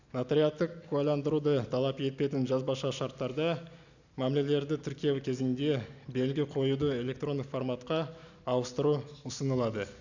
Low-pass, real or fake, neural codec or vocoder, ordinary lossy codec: 7.2 kHz; real; none; none